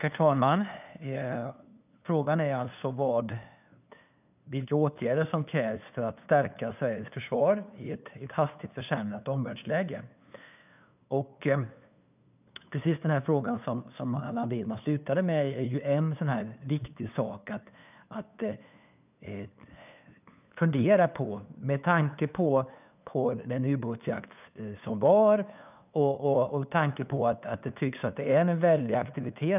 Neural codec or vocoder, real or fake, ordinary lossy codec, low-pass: codec, 16 kHz, 2 kbps, FunCodec, trained on LibriTTS, 25 frames a second; fake; none; 3.6 kHz